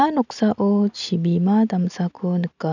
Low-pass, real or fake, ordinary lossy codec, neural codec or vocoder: 7.2 kHz; real; none; none